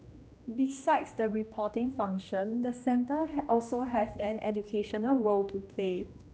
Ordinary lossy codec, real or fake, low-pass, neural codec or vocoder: none; fake; none; codec, 16 kHz, 1 kbps, X-Codec, HuBERT features, trained on general audio